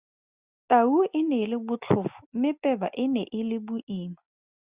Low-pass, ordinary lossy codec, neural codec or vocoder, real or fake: 3.6 kHz; Opus, 64 kbps; none; real